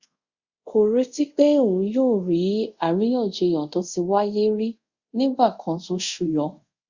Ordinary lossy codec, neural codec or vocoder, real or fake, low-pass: Opus, 64 kbps; codec, 24 kHz, 0.5 kbps, DualCodec; fake; 7.2 kHz